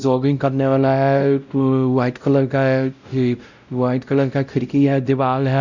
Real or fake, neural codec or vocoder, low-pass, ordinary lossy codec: fake; codec, 16 kHz, 0.5 kbps, X-Codec, WavLM features, trained on Multilingual LibriSpeech; 7.2 kHz; none